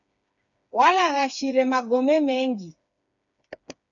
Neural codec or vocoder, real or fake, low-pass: codec, 16 kHz, 4 kbps, FreqCodec, smaller model; fake; 7.2 kHz